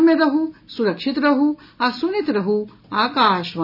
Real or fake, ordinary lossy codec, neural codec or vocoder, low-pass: real; none; none; 5.4 kHz